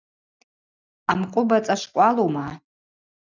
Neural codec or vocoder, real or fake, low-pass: none; real; 7.2 kHz